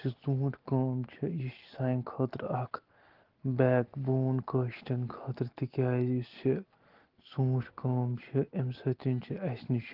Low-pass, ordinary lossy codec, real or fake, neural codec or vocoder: 5.4 kHz; Opus, 16 kbps; real; none